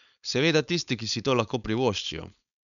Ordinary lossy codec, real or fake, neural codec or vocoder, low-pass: none; fake; codec, 16 kHz, 4.8 kbps, FACodec; 7.2 kHz